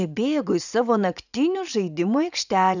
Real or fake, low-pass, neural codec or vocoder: fake; 7.2 kHz; vocoder, 44.1 kHz, 80 mel bands, Vocos